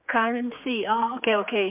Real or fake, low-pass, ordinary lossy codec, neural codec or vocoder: fake; 3.6 kHz; MP3, 32 kbps; codec, 16 kHz, 4 kbps, X-Codec, HuBERT features, trained on general audio